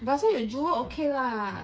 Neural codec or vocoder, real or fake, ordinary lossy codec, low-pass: codec, 16 kHz, 4 kbps, FreqCodec, smaller model; fake; none; none